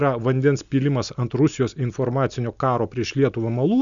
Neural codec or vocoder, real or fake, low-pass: none; real; 7.2 kHz